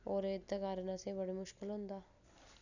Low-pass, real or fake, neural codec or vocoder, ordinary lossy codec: 7.2 kHz; real; none; none